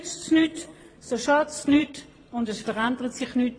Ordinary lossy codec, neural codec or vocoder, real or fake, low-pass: AAC, 32 kbps; vocoder, 22.05 kHz, 80 mel bands, Vocos; fake; 9.9 kHz